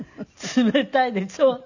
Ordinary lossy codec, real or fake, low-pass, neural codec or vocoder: none; real; 7.2 kHz; none